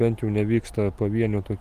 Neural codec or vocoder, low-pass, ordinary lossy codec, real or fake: none; 14.4 kHz; Opus, 32 kbps; real